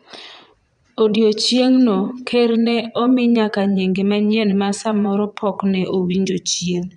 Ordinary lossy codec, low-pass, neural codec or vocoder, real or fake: none; 9.9 kHz; vocoder, 22.05 kHz, 80 mel bands, Vocos; fake